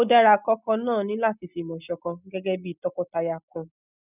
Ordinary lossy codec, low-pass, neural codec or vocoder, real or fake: none; 3.6 kHz; none; real